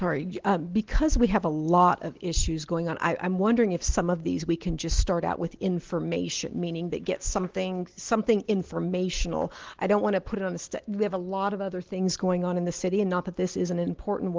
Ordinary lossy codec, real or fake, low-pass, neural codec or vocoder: Opus, 16 kbps; real; 7.2 kHz; none